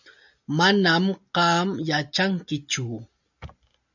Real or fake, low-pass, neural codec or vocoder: real; 7.2 kHz; none